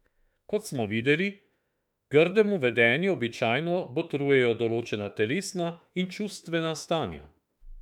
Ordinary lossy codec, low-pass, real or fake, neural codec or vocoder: none; 19.8 kHz; fake; autoencoder, 48 kHz, 32 numbers a frame, DAC-VAE, trained on Japanese speech